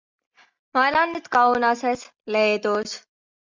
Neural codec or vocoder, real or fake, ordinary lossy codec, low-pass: none; real; MP3, 64 kbps; 7.2 kHz